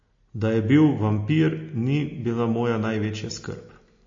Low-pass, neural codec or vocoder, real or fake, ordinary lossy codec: 7.2 kHz; none; real; MP3, 32 kbps